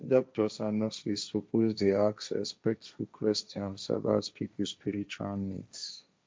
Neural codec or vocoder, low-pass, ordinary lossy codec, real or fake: codec, 16 kHz, 1.1 kbps, Voila-Tokenizer; none; none; fake